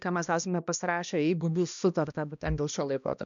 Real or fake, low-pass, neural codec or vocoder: fake; 7.2 kHz; codec, 16 kHz, 1 kbps, X-Codec, HuBERT features, trained on balanced general audio